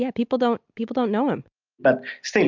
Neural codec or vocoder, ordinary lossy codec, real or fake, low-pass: none; MP3, 64 kbps; real; 7.2 kHz